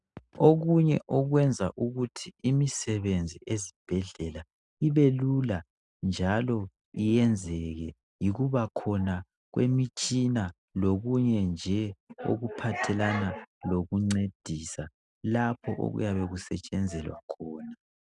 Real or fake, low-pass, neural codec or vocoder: real; 10.8 kHz; none